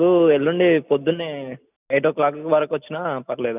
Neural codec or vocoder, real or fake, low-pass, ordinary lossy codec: none; real; 3.6 kHz; none